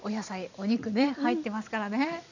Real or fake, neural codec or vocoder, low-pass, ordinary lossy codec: fake; vocoder, 44.1 kHz, 128 mel bands every 256 samples, BigVGAN v2; 7.2 kHz; none